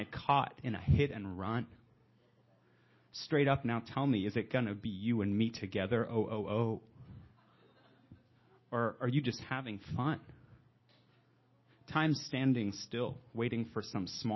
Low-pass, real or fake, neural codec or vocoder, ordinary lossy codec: 7.2 kHz; real; none; MP3, 24 kbps